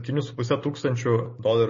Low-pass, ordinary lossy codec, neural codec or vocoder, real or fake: 7.2 kHz; MP3, 32 kbps; none; real